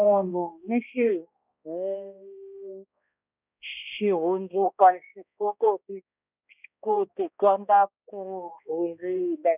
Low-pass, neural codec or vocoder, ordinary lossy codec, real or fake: 3.6 kHz; codec, 16 kHz, 1 kbps, X-Codec, HuBERT features, trained on balanced general audio; none; fake